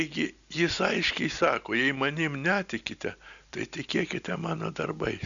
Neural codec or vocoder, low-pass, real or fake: none; 7.2 kHz; real